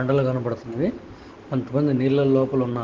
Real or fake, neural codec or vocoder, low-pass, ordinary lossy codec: real; none; 7.2 kHz; Opus, 16 kbps